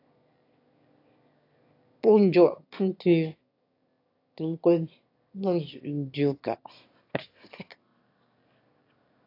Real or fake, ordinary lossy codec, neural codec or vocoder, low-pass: fake; AAC, 32 kbps; autoencoder, 22.05 kHz, a latent of 192 numbers a frame, VITS, trained on one speaker; 5.4 kHz